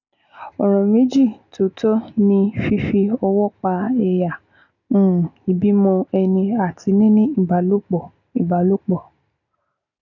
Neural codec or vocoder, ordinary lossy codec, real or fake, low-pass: none; none; real; 7.2 kHz